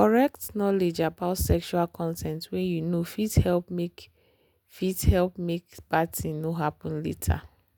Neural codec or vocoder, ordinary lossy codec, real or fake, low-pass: none; none; real; none